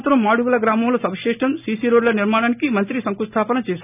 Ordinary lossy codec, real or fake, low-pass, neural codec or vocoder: none; real; 3.6 kHz; none